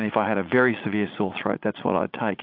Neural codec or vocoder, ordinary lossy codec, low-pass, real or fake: vocoder, 44.1 kHz, 80 mel bands, Vocos; AAC, 48 kbps; 5.4 kHz; fake